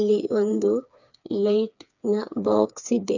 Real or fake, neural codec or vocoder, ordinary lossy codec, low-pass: fake; codec, 16 kHz, 4 kbps, FreqCodec, smaller model; none; 7.2 kHz